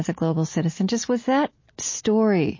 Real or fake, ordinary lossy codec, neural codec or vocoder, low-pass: real; MP3, 32 kbps; none; 7.2 kHz